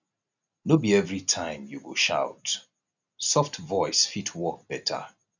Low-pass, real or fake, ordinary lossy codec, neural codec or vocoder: 7.2 kHz; real; none; none